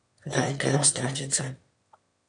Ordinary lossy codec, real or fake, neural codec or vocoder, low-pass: MP3, 64 kbps; fake; autoencoder, 22.05 kHz, a latent of 192 numbers a frame, VITS, trained on one speaker; 9.9 kHz